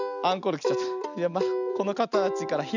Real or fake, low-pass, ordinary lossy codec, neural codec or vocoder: real; 7.2 kHz; none; none